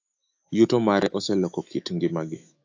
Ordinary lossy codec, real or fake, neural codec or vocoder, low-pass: AAC, 48 kbps; fake; autoencoder, 48 kHz, 128 numbers a frame, DAC-VAE, trained on Japanese speech; 7.2 kHz